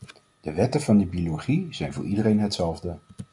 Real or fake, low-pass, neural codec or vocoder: real; 10.8 kHz; none